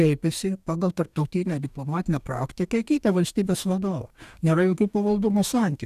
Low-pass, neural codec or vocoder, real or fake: 14.4 kHz; codec, 44.1 kHz, 2.6 kbps, DAC; fake